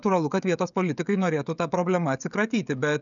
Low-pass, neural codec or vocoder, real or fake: 7.2 kHz; codec, 16 kHz, 16 kbps, FreqCodec, smaller model; fake